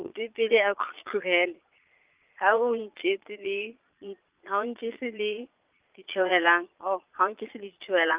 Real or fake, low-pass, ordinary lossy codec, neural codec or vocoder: fake; 3.6 kHz; Opus, 32 kbps; vocoder, 22.05 kHz, 80 mel bands, Vocos